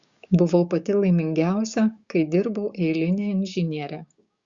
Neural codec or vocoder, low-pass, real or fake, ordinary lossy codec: codec, 16 kHz, 6 kbps, DAC; 7.2 kHz; fake; Opus, 64 kbps